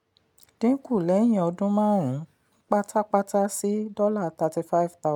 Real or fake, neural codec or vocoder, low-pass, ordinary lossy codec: real; none; 19.8 kHz; none